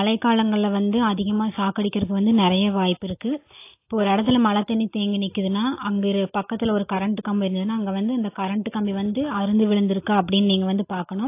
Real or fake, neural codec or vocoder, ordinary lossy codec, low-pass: real; none; AAC, 24 kbps; 3.6 kHz